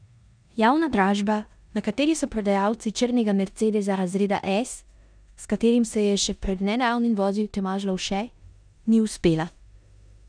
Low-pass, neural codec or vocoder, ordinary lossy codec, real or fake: 9.9 kHz; codec, 16 kHz in and 24 kHz out, 0.9 kbps, LongCat-Audio-Codec, four codebook decoder; none; fake